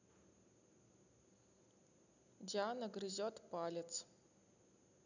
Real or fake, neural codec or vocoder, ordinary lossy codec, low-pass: real; none; none; 7.2 kHz